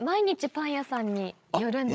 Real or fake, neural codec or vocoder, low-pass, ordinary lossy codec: fake; codec, 16 kHz, 16 kbps, FreqCodec, larger model; none; none